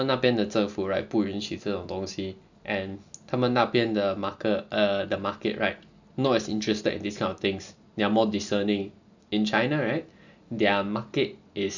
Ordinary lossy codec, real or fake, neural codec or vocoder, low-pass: none; real; none; 7.2 kHz